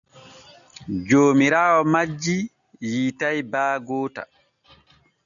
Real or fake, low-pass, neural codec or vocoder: real; 7.2 kHz; none